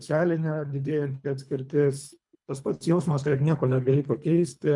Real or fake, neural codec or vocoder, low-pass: fake; codec, 24 kHz, 3 kbps, HILCodec; 10.8 kHz